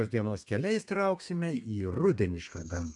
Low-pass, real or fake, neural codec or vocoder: 10.8 kHz; fake; codec, 32 kHz, 1.9 kbps, SNAC